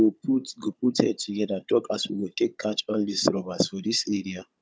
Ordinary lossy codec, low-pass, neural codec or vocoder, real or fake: none; none; codec, 16 kHz, 16 kbps, FunCodec, trained on Chinese and English, 50 frames a second; fake